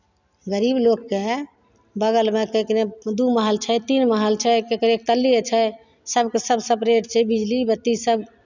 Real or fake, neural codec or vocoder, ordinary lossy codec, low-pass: real; none; none; 7.2 kHz